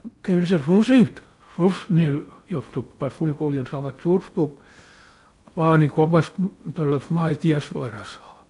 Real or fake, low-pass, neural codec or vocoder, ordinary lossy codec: fake; 10.8 kHz; codec, 16 kHz in and 24 kHz out, 0.6 kbps, FocalCodec, streaming, 4096 codes; AAC, 64 kbps